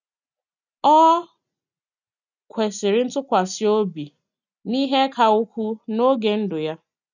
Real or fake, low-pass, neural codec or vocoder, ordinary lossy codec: real; 7.2 kHz; none; none